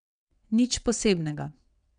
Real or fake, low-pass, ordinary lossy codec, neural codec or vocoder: fake; 9.9 kHz; none; vocoder, 22.05 kHz, 80 mel bands, WaveNeXt